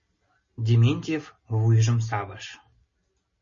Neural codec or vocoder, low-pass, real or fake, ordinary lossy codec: none; 7.2 kHz; real; MP3, 32 kbps